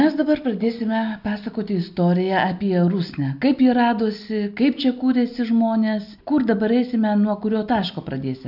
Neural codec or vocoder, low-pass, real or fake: none; 5.4 kHz; real